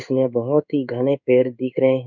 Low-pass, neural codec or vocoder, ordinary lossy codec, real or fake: 7.2 kHz; codec, 16 kHz in and 24 kHz out, 1 kbps, XY-Tokenizer; none; fake